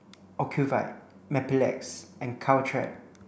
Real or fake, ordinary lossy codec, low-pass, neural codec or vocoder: real; none; none; none